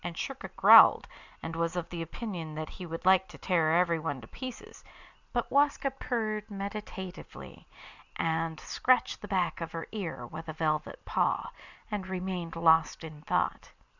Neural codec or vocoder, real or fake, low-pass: none; real; 7.2 kHz